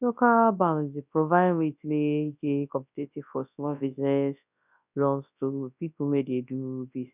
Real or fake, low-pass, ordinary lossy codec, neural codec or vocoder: fake; 3.6 kHz; none; codec, 24 kHz, 0.9 kbps, WavTokenizer, large speech release